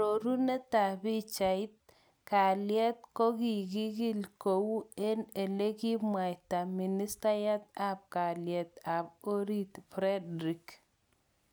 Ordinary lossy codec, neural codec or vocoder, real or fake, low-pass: none; none; real; none